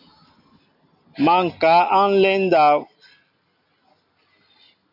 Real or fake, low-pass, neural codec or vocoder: real; 5.4 kHz; none